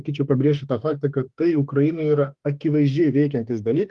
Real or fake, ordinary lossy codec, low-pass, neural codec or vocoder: fake; Opus, 24 kbps; 10.8 kHz; autoencoder, 48 kHz, 32 numbers a frame, DAC-VAE, trained on Japanese speech